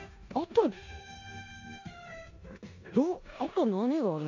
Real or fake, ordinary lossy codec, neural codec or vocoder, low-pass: fake; AAC, 48 kbps; codec, 16 kHz in and 24 kHz out, 0.9 kbps, LongCat-Audio-Codec, four codebook decoder; 7.2 kHz